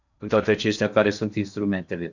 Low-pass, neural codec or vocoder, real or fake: 7.2 kHz; codec, 16 kHz in and 24 kHz out, 0.8 kbps, FocalCodec, streaming, 65536 codes; fake